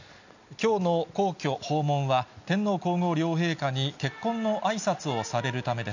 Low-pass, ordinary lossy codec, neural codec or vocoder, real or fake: 7.2 kHz; none; none; real